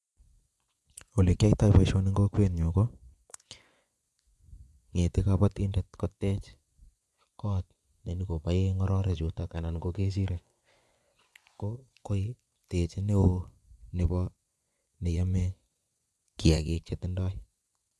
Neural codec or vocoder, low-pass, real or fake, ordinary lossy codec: vocoder, 24 kHz, 100 mel bands, Vocos; none; fake; none